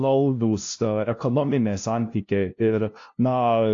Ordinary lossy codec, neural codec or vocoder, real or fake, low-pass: AAC, 48 kbps; codec, 16 kHz, 0.5 kbps, FunCodec, trained on LibriTTS, 25 frames a second; fake; 7.2 kHz